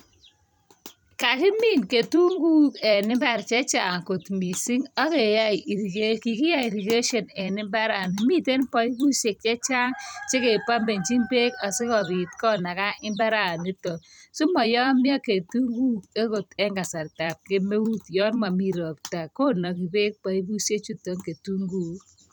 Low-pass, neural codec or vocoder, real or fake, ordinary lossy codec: 19.8 kHz; vocoder, 44.1 kHz, 128 mel bands every 256 samples, BigVGAN v2; fake; none